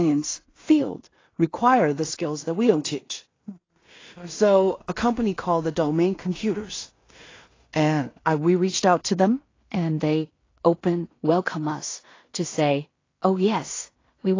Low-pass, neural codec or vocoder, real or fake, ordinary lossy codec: 7.2 kHz; codec, 16 kHz in and 24 kHz out, 0.4 kbps, LongCat-Audio-Codec, two codebook decoder; fake; AAC, 32 kbps